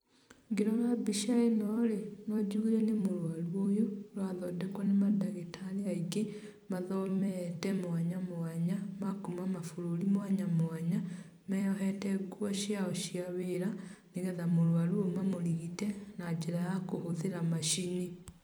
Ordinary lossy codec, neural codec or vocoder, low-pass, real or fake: none; vocoder, 44.1 kHz, 128 mel bands every 256 samples, BigVGAN v2; none; fake